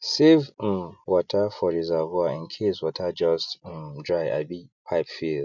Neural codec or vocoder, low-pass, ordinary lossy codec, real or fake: none; 7.2 kHz; none; real